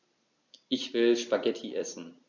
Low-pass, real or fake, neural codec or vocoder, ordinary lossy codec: 7.2 kHz; real; none; AAC, 48 kbps